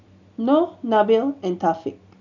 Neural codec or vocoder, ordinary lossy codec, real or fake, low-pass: none; none; real; 7.2 kHz